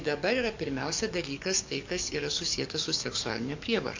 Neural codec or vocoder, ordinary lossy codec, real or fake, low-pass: codec, 44.1 kHz, 7.8 kbps, DAC; MP3, 64 kbps; fake; 7.2 kHz